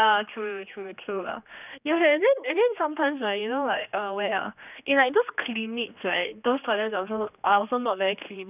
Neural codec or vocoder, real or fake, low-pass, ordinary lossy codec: codec, 16 kHz, 2 kbps, X-Codec, HuBERT features, trained on general audio; fake; 3.6 kHz; none